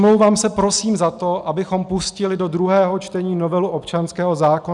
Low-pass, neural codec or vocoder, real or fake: 10.8 kHz; none; real